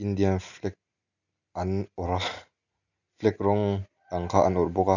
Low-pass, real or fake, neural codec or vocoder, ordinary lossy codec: 7.2 kHz; real; none; none